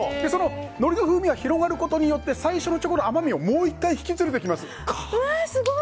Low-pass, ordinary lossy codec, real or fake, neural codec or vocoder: none; none; real; none